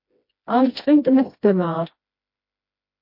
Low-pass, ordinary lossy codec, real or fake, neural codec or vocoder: 5.4 kHz; MP3, 32 kbps; fake; codec, 16 kHz, 1 kbps, FreqCodec, smaller model